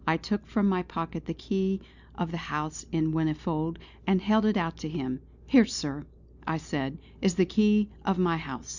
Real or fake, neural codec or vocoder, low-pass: real; none; 7.2 kHz